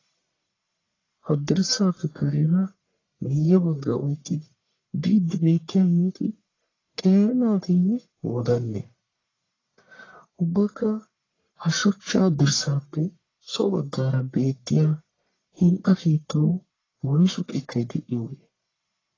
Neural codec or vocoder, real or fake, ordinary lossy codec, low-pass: codec, 44.1 kHz, 1.7 kbps, Pupu-Codec; fake; AAC, 32 kbps; 7.2 kHz